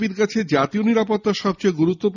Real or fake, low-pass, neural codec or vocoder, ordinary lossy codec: real; 7.2 kHz; none; none